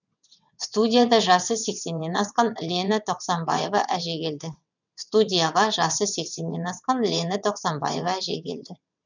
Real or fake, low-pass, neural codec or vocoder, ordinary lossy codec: fake; 7.2 kHz; vocoder, 22.05 kHz, 80 mel bands, WaveNeXt; none